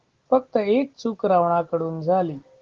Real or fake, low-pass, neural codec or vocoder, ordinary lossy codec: real; 7.2 kHz; none; Opus, 16 kbps